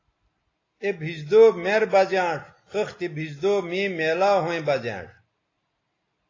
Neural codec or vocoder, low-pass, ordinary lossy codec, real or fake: none; 7.2 kHz; AAC, 32 kbps; real